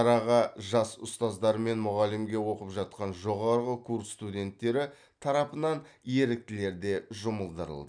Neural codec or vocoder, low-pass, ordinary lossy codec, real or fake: none; 9.9 kHz; none; real